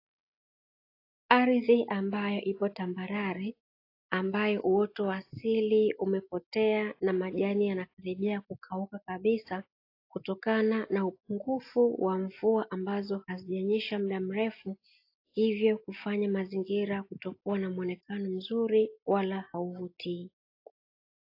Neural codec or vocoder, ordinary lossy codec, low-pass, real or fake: none; AAC, 32 kbps; 5.4 kHz; real